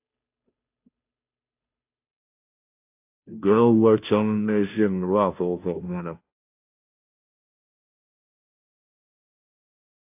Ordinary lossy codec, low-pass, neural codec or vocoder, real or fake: AAC, 32 kbps; 3.6 kHz; codec, 16 kHz, 0.5 kbps, FunCodec, trained on Chinese and English, 25 frames a second; fake